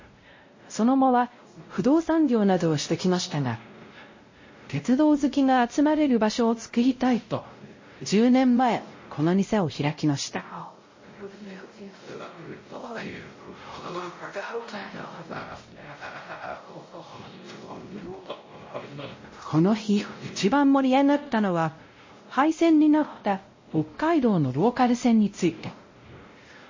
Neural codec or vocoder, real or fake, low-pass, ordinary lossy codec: codec, 16 kHz, 0.5 kbps, X-Codec, WavLM features, trained on Multilingual LibriSpeech; fake; 7.2 kHz; MP3, 32 kbps